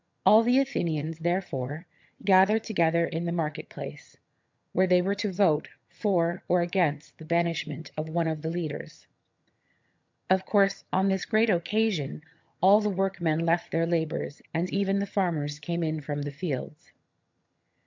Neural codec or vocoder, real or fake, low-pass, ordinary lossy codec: vocoder, 22.05 kHz, 80 mel bands, HiFi-GAN; fake; 7.2 kHz; MP3, 64 kbps